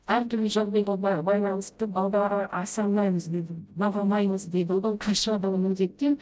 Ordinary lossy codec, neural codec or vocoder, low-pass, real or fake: none; codec, 16 kHz, 0.5 kbps, FreqCodec, smaller model; none; fake